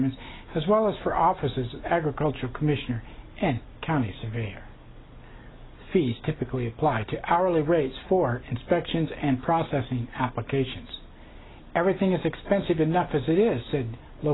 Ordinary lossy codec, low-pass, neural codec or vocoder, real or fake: AAC, 16 kbps; 7.2 kHz; none; real